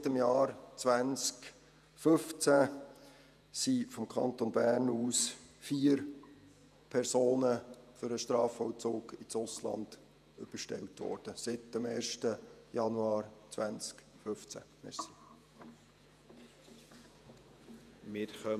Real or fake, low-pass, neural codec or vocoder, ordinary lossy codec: fake; 14.4 kHz; vocoder, 48 kHz, 128 mel bands, Vocos; none